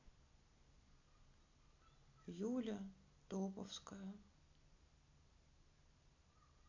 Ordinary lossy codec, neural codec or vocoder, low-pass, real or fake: AAC, 48 kbps; none; 7.2 kHz; real